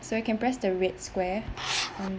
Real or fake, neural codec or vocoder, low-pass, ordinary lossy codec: real; none; none; none